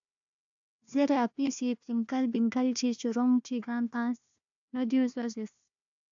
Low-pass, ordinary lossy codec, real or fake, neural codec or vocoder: 7.2 kHz; AAC, 64 kbps; fake; codec, 16 kHz, 1 kbps, FunCodec, trained on Chinese and English, 50 frames a second